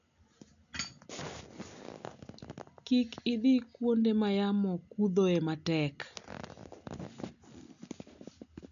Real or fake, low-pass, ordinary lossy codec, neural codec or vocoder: real; 7.2 kHz; none; none